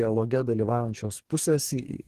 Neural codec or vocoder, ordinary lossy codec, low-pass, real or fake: codec, 44.1 kHz, 2.6 kbps, DAC; Opus, 16 kbps; 14.4 kHz; fake